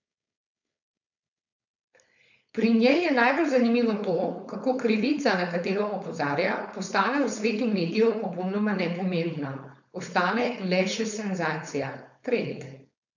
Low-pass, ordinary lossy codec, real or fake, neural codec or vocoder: 7.2 kHz; none; fake; codec, 16 kHz, 4.8 kbps, FACodec